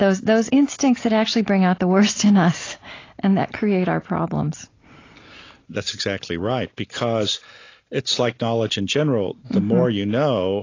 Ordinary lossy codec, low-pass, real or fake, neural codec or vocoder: AAC, 32 kbps; 7.2 kHz; real; none